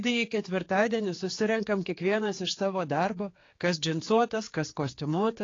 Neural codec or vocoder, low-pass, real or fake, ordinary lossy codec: codec, 16 kHz, 4 kbps, X-Codec, HuBERT features, trained on general audio; 7.2 kHz; fake; AAC, 32 kbps